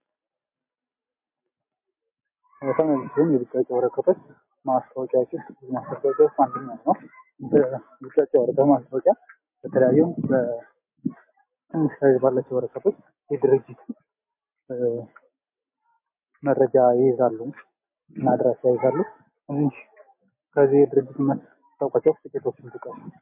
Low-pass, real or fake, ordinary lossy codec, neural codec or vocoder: 3.6 kHz; real; MP3, 24 kbps; none